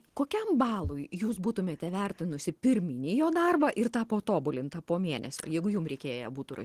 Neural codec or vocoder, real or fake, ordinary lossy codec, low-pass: none; real; Opus, 16 kbps; 14.4 kHz